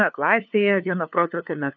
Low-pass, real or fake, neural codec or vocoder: 7.2 kHz; fake; codec, 16 kHz, 2 kbps, FunCodec, trained on LibriTTS, 25 frames a second